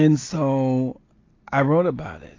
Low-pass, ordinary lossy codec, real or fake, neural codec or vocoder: 7.2 kHz; AAC, 32 kbps; real; none